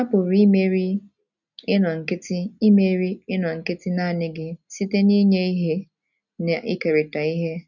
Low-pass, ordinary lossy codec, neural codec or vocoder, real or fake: 7.2 kHz; none; none; real